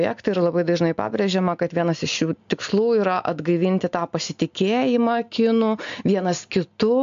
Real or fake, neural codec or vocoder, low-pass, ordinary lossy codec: real; none; 7.2 kHz; AAC, 64 kbps